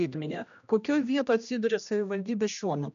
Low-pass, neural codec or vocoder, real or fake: 7.2 kHz; codec, 16 kHz, 1 kbps, X-Codec, HuBERT features, trained on general audio; fake